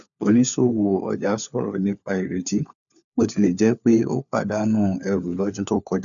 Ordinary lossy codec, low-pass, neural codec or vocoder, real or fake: none; 7.2 kHz; codec, 16 kHz, 4 kbps, FunCodec, trained on LibriTTS, 50 frames a second; fake